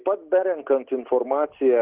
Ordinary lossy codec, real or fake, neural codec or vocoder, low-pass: Opus, 16 kbps; real; none; 3.6 kHz